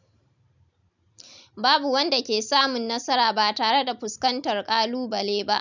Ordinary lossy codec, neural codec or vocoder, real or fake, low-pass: none; none; real; 7.2 kHz